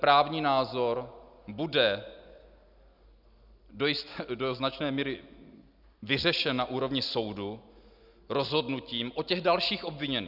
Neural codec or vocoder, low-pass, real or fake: none; 5.4 kHz; real